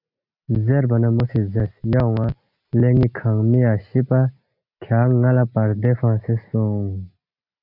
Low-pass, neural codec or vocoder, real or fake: 5.4 kHz; none; real